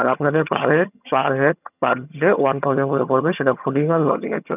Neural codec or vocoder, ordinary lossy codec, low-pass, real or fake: vocoder, 22.05 kHz, 80 mel bands, HiFi-GAN; none; 3.6 kHz; fake